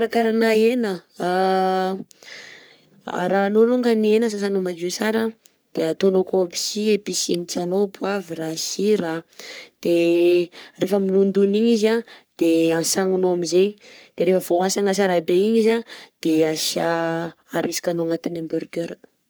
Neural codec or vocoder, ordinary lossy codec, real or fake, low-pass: codec, 44.1 kHz, 3.4 kbps, Pupu-Codec; none; fake; none